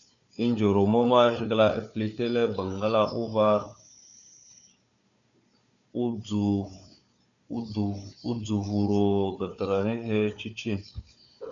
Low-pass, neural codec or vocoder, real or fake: 7.2 kHz; codec, 16 kHz, 4 kbps, FunCodec, trained on Chinese and English, 50 frames a second; fake